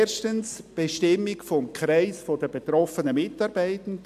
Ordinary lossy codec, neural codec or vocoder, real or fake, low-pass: AAC, 96 kbps; none; real; 14.4 kHz